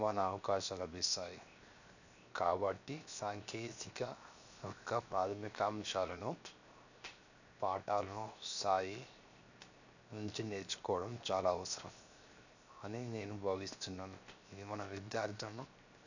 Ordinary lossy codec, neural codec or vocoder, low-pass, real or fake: none; codec, 16 kHz, 0.7 kbps, FocalCodec; 7.2 kHz; fake